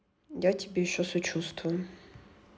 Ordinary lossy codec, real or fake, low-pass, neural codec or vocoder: none; real; none; none